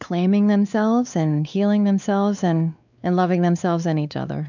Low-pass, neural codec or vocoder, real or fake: 7.2 kHz; codec, 16 kHz, 4 kbps, X-Codec, WavLM features, trained on Multilingual LibriSpeech; fake